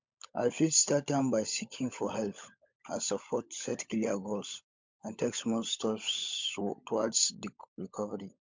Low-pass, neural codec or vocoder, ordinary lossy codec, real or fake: 7.2 kHz; codec, 16 kHz, 16 kbps, FunCodec, trained on LibriTTS, 50 frames a second; MP3, 64 kbps; fake